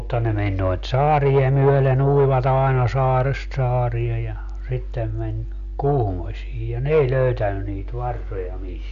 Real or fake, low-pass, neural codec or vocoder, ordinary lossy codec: real; 7.2 kHz; none; none